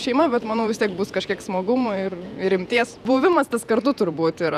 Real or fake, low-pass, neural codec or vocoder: fake; 14.4 kHz; vocoder, 48 kHz, 128 mel bands, Vocos